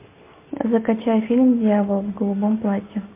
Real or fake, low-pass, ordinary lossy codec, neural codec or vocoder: real; 3.6 kHz; MP3, 24 kbps; none